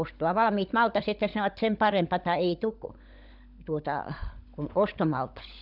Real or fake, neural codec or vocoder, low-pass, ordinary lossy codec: fake; codec, 16 kHz, 16 kbps, FunCodec, trained on LibriTTS, 50 frames a second; 5.4 kHz; none